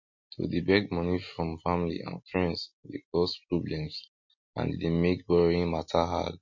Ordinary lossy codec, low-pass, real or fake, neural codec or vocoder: MP3, 32 kbps; 7.2 kHz; real; none